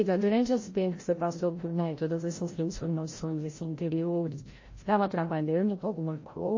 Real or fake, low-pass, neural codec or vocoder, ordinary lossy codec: fake; 7.2 kHz; codec, 16 kHz, 0.5 kbps, FreqCodec, larger model; MP3, 32 kbps